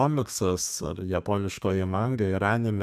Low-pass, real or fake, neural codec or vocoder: 14.4 kHz; fake; codec, 32 kHz, 1.9 kbps, SNAC